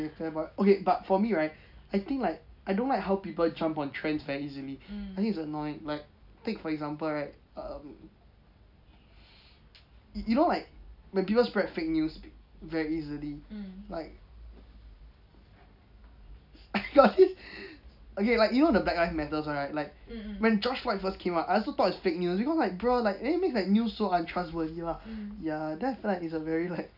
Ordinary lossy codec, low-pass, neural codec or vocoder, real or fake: none; 5.4 kHz; none; real